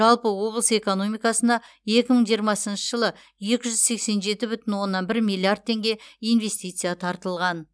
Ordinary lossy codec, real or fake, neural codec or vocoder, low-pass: none; real; none; none